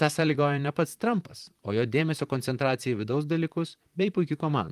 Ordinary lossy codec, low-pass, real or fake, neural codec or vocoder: Opus, 32 kbps; 14.4 kHz; fake; vocoder, 44.1 kHz, 128 mel bands, Pupu-Vocoder